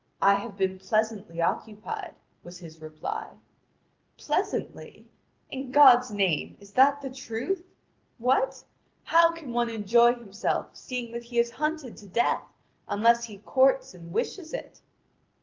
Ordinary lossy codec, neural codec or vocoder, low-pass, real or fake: Opus, 32 kbps; none; 7.2 kHz; real